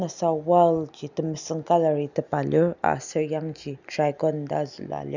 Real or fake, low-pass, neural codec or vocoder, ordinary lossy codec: real; 7.2 kHz; none; none